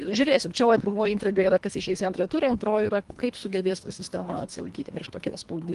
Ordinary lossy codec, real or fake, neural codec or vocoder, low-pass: Opus, 24 kbps; fake; codec, 24 kHz, 1.5 kbps, HILCodec; 10.8 kHz